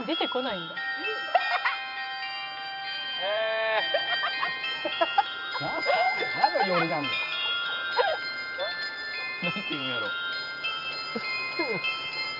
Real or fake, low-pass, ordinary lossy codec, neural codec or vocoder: fake; 5.4 kHz; none; vocoder, 44.1 kHz, 128 mel bands every 512 samples, BigVGAN v2